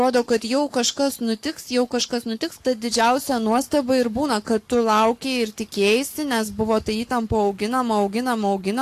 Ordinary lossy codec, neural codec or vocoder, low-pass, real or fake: AAC, 64 kbps; codec, 44.1 kHz, 7.8 kbps, Pupu-Codec; 14.4 kHz; fake